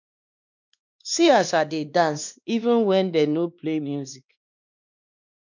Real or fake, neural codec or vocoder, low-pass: fake; codec, 16 kHz, 2 kbps, X-Codec, WavLM features, trained on Multilingual LibriSpeech; 7.2 kHz